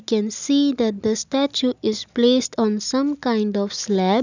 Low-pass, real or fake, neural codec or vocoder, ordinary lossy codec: 7.2 kHz; real; none; none